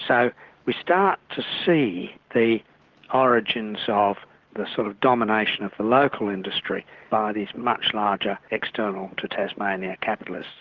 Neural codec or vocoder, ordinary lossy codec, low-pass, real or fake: none; Opus, 16 kbps; 7.2 kHz; real